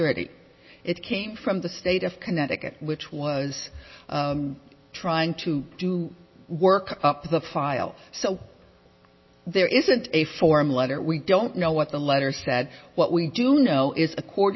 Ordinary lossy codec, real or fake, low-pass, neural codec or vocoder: MP3, 24 kbps; real; 7.2 kHz; none